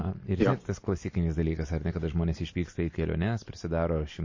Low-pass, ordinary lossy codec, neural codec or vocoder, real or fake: 7.2 kHz; MP3, 32 kbps; none; real